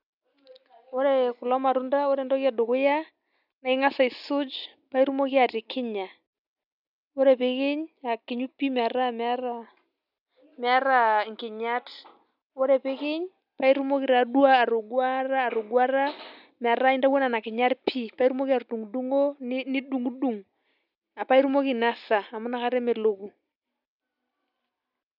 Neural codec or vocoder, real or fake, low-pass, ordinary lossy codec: none; real; 5.4 kHz; none